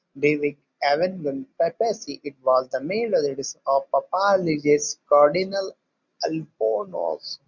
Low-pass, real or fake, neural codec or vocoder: 7.2 kHz; real; none